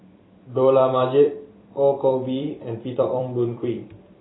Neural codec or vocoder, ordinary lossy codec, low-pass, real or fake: vocoder, 44.1 kHz, 128 mel bands every 256 samples, BigVGAN v2; AAC, 16 kbps; 7.2 kHz; fake